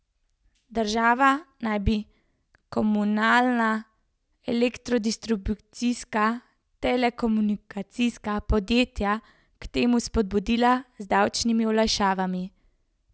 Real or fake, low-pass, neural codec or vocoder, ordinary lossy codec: real; none; none; none